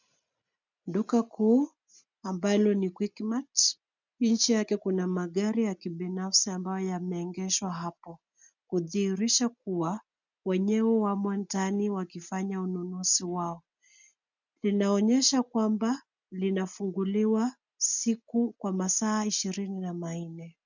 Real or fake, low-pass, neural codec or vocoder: real; 7.2 kHz; none